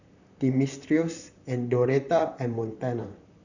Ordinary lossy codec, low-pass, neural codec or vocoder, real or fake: none; 7.2 kHz; vocoder, 44.1 kHz, 128 mel bands, Pupu-Vocoder; fake